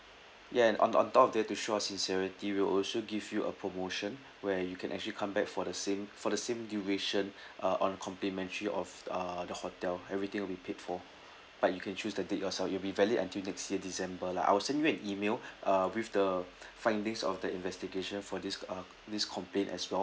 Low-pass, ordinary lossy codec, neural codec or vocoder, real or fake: none; none; none; real